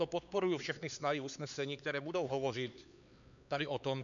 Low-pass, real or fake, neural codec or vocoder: 7.2 kHz; fake; codec, 16 kHz, 4 kbps, X-Codec, HuBERT features, trained on LibriSpeech